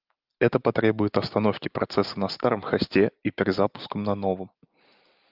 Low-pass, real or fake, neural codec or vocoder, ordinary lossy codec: 5.4 kHz; real; none; Opus, 24 kbps